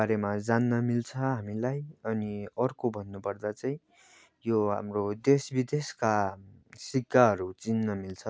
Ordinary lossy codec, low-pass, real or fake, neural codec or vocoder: none; none; real; none